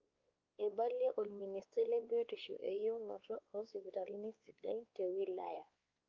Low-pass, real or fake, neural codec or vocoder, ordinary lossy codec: 7.2 kHz; fake; codec, 16 kHz, 2 kbps, X-Codec, WavLM features, trained on Multilingual LibriSpeech; Opus, 24 kbps